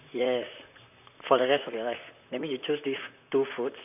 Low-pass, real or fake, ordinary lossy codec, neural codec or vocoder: 3.6 kHz; fake; none; vocoder, 44.1 kHz, 128 mel bands, Pupu-Vocoder